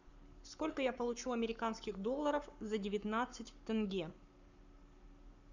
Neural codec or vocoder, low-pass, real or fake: codec, 44.1 kHz, 7.8 kbps, Pupu-Codec; 7.2 kHz; fake